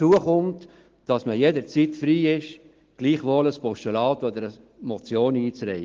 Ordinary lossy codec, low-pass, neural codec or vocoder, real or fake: Opus, 16 kbps; 7.2 kHz; none; real